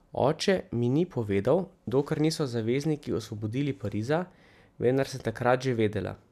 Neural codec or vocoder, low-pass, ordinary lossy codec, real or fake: none; 14.4 kHz; none; real